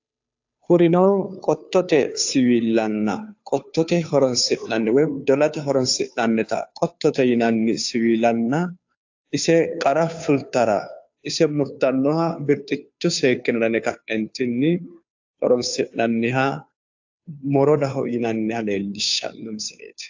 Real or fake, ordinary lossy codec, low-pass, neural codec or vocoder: fake; AAC, 48 kbps; 7.2 kHz; codec, 16 kHz, 2 kbps, FunCodec, trained on Chinese and English, 25 frames a second